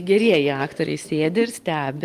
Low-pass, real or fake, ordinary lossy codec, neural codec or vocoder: 14.4 kHz; real; Opus, 32 kbps; none